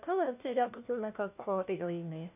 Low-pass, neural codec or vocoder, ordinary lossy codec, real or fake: 3.6 kHz; codec, 16 kHz, 1 kbps, FunCodec, trained on LibriTTS, 50 frames a second; none; fake